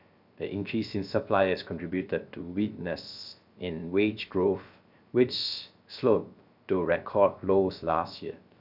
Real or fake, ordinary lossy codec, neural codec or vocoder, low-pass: fake; none; codec, 16 kHz, 0.3 kbps, FocalCodec; 5.4 kHz